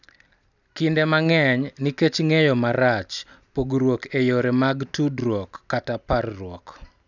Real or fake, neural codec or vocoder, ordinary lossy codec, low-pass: real; none; none; 7.2 kHz